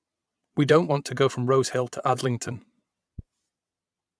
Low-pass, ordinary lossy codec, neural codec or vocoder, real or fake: none; none; vocoder, 22.05 kHz, 80 mel bands, Vocos; fake